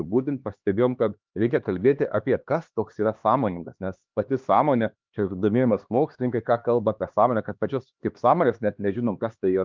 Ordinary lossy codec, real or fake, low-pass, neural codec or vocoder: Opus, 24 kbps; fake; 7.2 kHz; codec, 16 kHz, 2 kbps, X-Codec, HuBERT features, trained on LibriSpeech